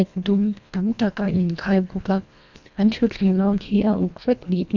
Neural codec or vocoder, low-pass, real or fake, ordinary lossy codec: codec, 24 kHz, 1.5 kbps, HILCodec; 7.2 kHz; fake; none